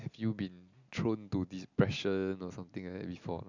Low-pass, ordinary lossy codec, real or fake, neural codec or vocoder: 7.2 kHz; none; real; none